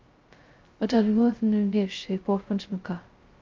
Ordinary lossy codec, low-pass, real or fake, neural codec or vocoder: Opus, 32 kbps; 7.2 kHz; fake; codec, 16 kHz, 0.2 kbps, FocalCodec